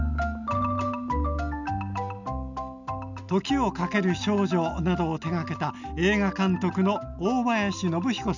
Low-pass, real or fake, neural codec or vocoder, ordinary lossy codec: 7.2 kHz; real; none; none